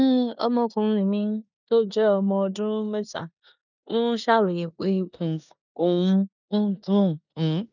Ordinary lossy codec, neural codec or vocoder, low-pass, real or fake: none; codec, 16 kHz in and 24 kHz out, 0.9 kbps, LongCat-Audio-Codec, four codebook decoder; 7.2 kHz; fake